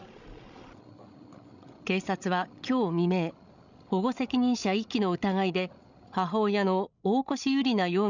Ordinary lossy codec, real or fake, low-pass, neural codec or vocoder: none; fake; 7.2 kHz; codec, 16 kHz, 16 kbps, FreqCodec, larger model